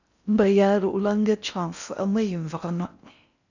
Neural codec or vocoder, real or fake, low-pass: codec, 16 kHz in and 24 kHz out, 0.6 kbps, FocalCodec, streaming, 4096 codes; fake; 7.2 kHz